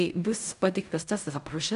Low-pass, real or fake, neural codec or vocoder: 10.8 kHz; fake; codec, 16 kHz in and 24 kHz out, 0.4 kbps, LongCat-Audio-Codec, fine tuned four codebook decoder